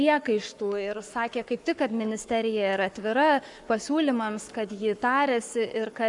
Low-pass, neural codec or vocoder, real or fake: 10.8 kHz; codec, 44.1 kHz, 7.8 kbps, Pupu-Codec; fake